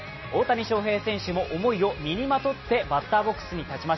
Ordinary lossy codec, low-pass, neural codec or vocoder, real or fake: MP3, 24 kbps; 7.2 kHz; none; real